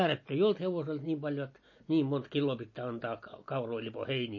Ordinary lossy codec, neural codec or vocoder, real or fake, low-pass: MP3, 32 kbps; none; real; 7.2 kHz